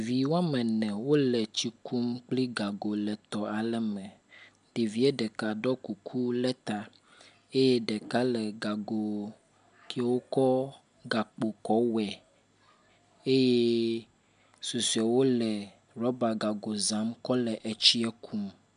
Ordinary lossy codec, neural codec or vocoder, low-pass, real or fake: MP3, 96 kbps; none; 9.9 kHz; real